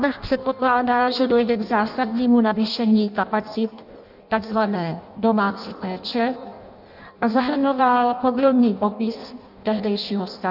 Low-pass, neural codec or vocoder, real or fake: 5.4 kHz; codec, 16 kHz in and 24 kHz out, 0.6 kbps, FireRedTTS-2 codec; fake